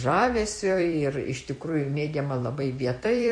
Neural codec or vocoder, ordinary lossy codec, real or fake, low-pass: vocoder, 44.1 kHz, 128 mel bands every 256 samples, BigVGAN v2; MP3, 48 kbps; fake; 9.9 kHz